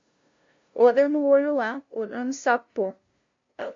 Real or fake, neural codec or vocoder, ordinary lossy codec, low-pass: fake; codec, 16 kHz, 0.5 kbps, FunCodec, trained on LibriTTS, 25 frames a second; MP3, 48 kbps; 7.2 kHz